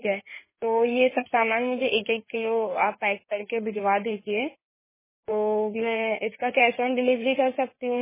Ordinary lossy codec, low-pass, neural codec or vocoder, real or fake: MP3, 16 kbps; 3.6 kHz; codec, 16 kHz in and 24 kHz out, 2.2 kbps, FireRedTTS-2 codec; fake